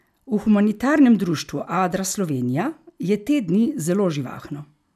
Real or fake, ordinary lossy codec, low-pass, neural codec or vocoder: real; none; 14.4 kHz; none